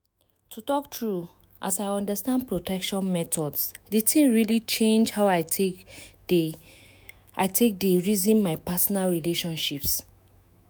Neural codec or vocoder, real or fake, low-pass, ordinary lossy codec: autoencoder, 48 kHz, 128 numbers a frame, DAC-VAE, trained on Japanese speech; fake; none; none